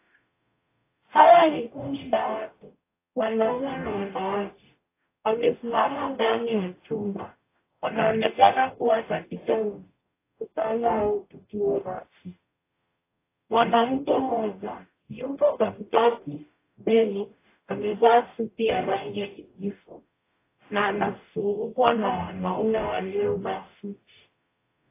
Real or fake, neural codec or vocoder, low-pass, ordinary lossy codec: fake; codec, 44.1 kHz, 0.9 kbps, DAC; 3.6 kHz; AAC, 24 kbps